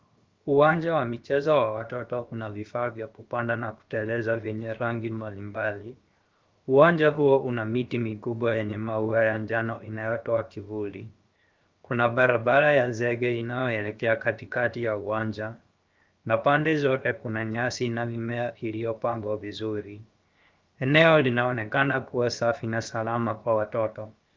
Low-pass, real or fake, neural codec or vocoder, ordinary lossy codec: 7.2 kHz; fake; codec, 16 kHz, 0.7 kbps, FocalCodec; Opus, 32 kbps